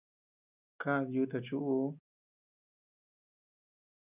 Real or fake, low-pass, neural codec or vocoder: real; 3.6 kHz; none